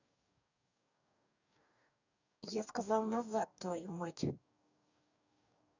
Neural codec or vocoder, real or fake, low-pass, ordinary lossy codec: codec, 44.1 kHz, 2.6 kbps, DAC; fake; 7.2 kHz; none